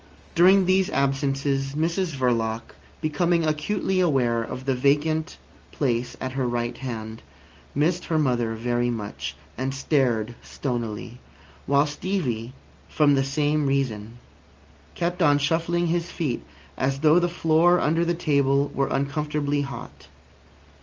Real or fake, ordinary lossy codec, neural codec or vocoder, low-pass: real; Opus, 24 kbps; none; 7.2 kHz